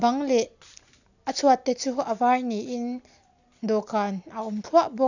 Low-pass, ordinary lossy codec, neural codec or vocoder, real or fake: 7.2 kHz; none; none; real